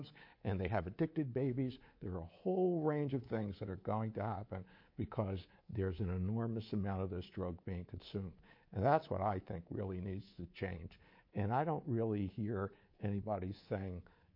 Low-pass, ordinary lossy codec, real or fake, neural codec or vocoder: 5.4 kHz; MP3, 32 kbps; fake; codec, 24 kHz, 3.1 kbps, DualCodec